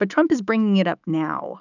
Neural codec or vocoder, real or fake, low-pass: autoencoder, 48 kHz, 128 numbers a frame, DAC-VAE, trained on Japanese speech; fake; 7.2 kHz